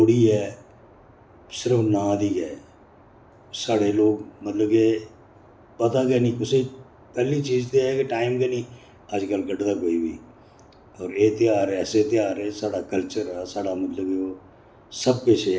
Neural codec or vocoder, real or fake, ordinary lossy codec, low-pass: none; real; none; none